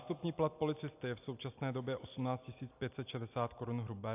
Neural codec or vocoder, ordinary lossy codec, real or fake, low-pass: none; AAC, 32 kbps; real; 3.6 kHz